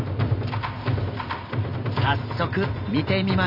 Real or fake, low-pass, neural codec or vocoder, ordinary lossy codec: real; 5.4 kHz; none; Opus, 64 kbps